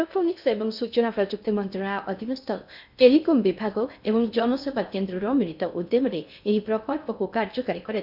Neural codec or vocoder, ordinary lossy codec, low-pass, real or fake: codec, 16 kHz in and 24 kHz out, 0.6 kbps, FocalCodec, streaming, 2048 codes; none; 5.4 kHz; fake